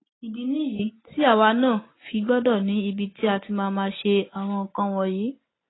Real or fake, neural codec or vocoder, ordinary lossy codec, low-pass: real; none; AAC, 16 kbps; 7.2 kHz